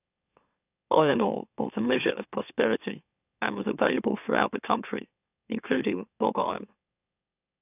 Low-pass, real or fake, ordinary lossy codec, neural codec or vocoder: 3.6 kHz; fake; none; autoencoder, 44.1 kHz, a latent of 192 numbers a frame, MeloTTS